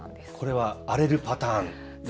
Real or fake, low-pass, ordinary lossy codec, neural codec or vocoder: real; none; none; none